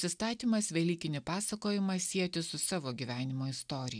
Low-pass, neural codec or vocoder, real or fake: 9.9 kHz; none; real